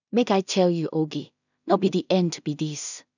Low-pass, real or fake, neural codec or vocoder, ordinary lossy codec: 7.2 kHz; fake; codec, 16 kHz in and 24 kHz out, 0.4 kbps, LongCat-Audio-Codec, two codebook decoder; none